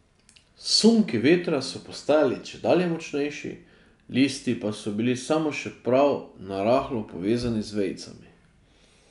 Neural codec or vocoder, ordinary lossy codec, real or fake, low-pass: none; none; real; 10.8 kHz